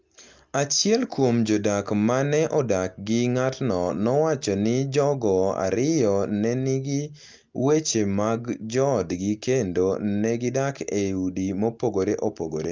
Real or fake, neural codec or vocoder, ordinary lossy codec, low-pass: real; none; Opus, 24 kbps; 7.2 kHz